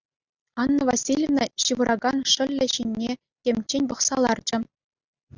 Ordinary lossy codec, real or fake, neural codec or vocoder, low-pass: Opus, 64 kbps; real; none; 7.2 kHz